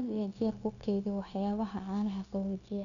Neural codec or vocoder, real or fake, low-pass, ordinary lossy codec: codec, 16 kHz, 0.9 kbps, LongCat-Audio-Codec; fake; 7.2 kHz; none